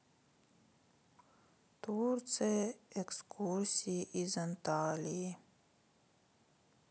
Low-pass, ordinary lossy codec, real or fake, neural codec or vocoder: none; none; real; none